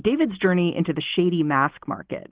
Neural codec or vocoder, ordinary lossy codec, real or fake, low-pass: none; Opus, 16 kbps; real; 3.6 kHz